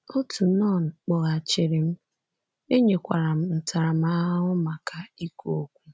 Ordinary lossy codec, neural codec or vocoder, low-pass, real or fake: none; none; none; real